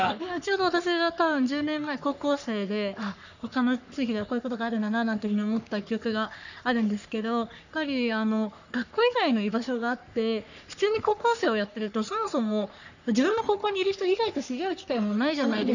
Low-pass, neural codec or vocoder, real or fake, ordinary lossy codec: 7.2 kHz; codec, 44.1 kHz, 3.4 kbps, Pupu-Codec; fake; none